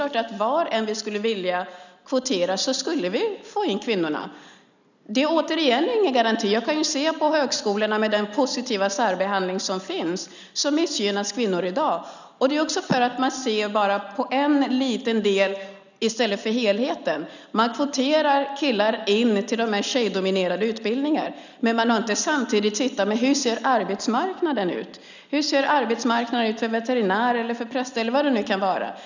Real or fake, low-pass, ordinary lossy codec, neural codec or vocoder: real; 7.2 kHz; none; none